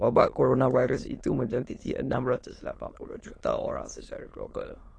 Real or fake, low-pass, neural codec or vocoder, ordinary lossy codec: fake; 9.9 kHz; autoencoder, 22.05 kHz, a latent of 192 numbers a frame, VITS, trained on many speakers; AAC, 32 kbps